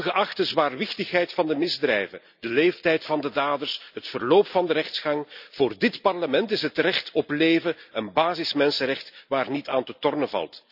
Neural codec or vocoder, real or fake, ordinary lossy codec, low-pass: none; real; none; 5.4 kHz